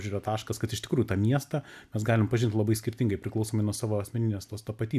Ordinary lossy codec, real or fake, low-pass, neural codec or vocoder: AAC, 96 kbps; real; 14.4 kHz; none